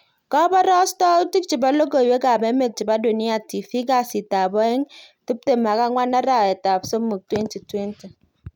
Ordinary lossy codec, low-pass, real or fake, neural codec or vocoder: none; 19.8 kHz; real; none